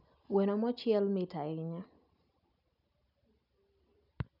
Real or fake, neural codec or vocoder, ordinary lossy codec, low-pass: real; none; none; 5.4 kHz